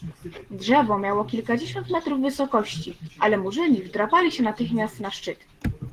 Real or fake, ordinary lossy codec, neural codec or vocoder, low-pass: real; Opus, 16 kbps; none; 14.4 kHz